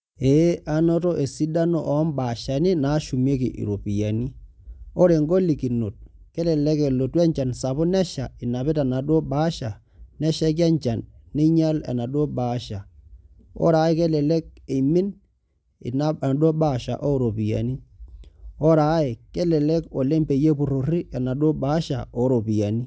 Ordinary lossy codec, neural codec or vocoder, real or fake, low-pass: none; none; real; none